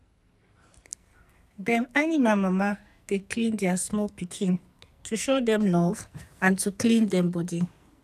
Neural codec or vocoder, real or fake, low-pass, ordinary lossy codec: codec, 44.1 kHz, 2.6 kbps, SNAC; fake; 14.4 kHz; none